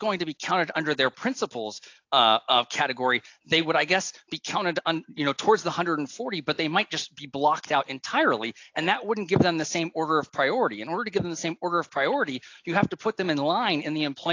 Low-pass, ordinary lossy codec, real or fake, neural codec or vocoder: 7.2 kHz; AAC, 48 kbps; real; none